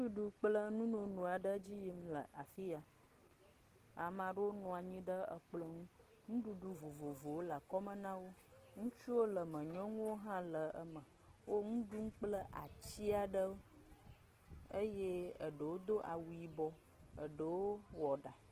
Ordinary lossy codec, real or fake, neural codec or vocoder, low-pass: Opus, 16 kbps; real; none; 14.4 kHz